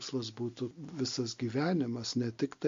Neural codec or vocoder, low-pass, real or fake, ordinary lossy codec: none; 7.2 kHz; real; MP3, 48 kbps